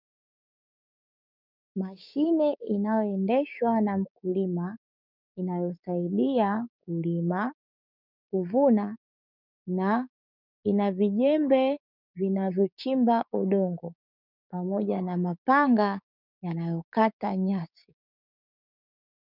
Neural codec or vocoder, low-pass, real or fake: codec, 16 kHz, 6 kbps, DAC; 5.4 kHz; fake